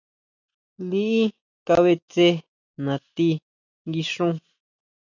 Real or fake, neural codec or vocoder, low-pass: real; none; 7.2 kHz